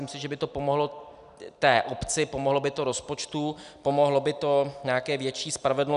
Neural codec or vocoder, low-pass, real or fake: none; 10.8 kHz; real